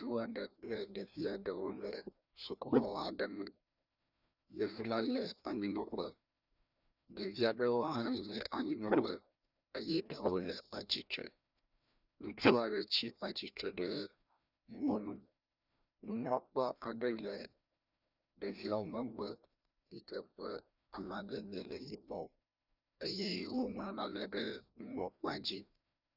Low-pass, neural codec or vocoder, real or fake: 5.4 kHz; codec, 16 kHz, 1 kbps, FreqCodec, larger model; fake